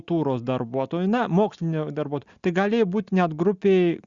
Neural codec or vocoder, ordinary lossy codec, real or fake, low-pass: none; Opus, 64 kbps; real; 7.2 kHz